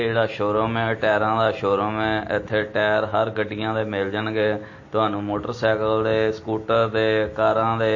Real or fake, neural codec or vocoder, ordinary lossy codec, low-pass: real; none; MP3, 32 kbps; 7.2 kHz